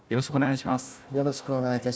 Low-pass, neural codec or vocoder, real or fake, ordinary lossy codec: none; codec, 16 kHz, 1 kbps, FunCodec, trained on Chinese and English, 50 frames a second; fake; none